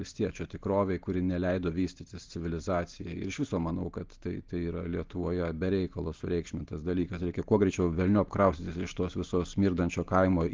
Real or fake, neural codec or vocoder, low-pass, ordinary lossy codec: real; none; 7.2 kHz; Opus, 16 kbps